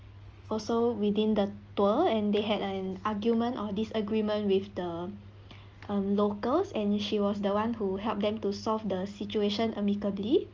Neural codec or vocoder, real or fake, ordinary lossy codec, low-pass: none; real; Opus, 24 kbps; 7.2 kHz